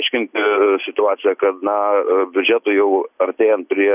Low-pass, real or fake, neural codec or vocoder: 3.6 kHz; real; none